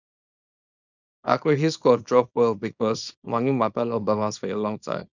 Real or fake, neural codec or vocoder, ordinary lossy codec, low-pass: fake; codec, 24 kHz, 0.9 kbps, WavTokenizer, small release; none; 7.2 kHz